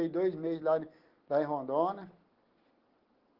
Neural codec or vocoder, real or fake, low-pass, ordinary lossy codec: none; real; 5.4 kHz; Opus, 16 kbps